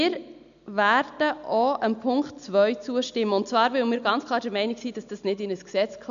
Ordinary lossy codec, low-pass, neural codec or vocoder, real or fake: none; 7.2 kHz; none; real